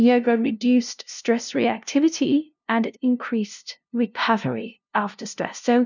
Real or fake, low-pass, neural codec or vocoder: fake; 7.2 kHz; codec, 16 kHz, 0.5 kbps, FunCodec, trained on LibriTTS, 25 frames a second